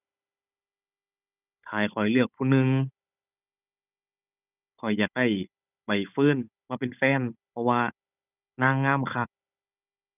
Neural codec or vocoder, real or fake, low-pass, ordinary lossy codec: codec, 16 kHz, 16 kbps, FunCodec, trained on Chinese and English, 50 frames a second; fake; 3.6 kHz; none